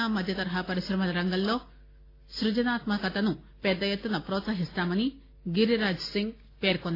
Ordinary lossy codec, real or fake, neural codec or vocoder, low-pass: AAC, 24 kbps; real; none; 5.4 kHz